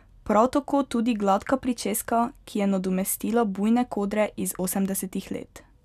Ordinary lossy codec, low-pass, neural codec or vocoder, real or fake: none; 14.4 kHz; none; real